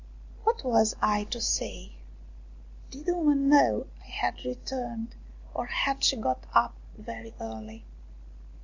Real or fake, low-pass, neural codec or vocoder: real; 7.2 kHz; none